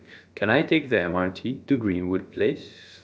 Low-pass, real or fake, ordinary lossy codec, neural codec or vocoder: none; fake; none; codec, 16 kHz, about 1 kbps, DyCAST, with the encoder's durations